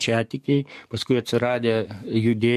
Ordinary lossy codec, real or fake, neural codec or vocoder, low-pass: MP3, 64 kbps; fake; codec, 44.1 kHz, 7.8 kbps, DAC; 14.4 kHz